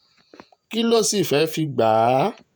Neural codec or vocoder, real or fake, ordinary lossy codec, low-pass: vocoder, 48 kHz, 128 mel bands, Vocos; fake; none; none